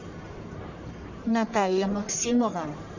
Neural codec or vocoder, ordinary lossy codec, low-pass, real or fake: codec, 44.1 kHz, 1.7 kbps, Pupu-Codec; Opus, 64 kbps; 7.2 kHz; fake